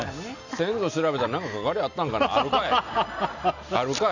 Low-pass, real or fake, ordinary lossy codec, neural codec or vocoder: 7.2 kHz; real; none; none